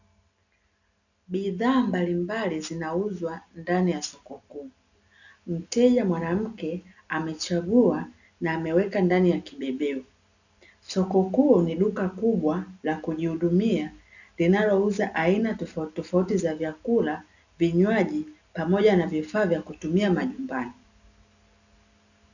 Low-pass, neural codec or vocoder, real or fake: 7.2 kHz; none; real